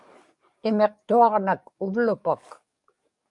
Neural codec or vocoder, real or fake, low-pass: codec, 44.1 kHz, 7.8 kbps, DAC; fake; 10.8 kHz